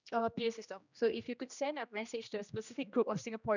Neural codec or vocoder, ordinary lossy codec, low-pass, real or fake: codec, 16 kHz, 1 kbps, X-Codec, HuBERT features, trained on general audio; none; 7.2 kHz; fake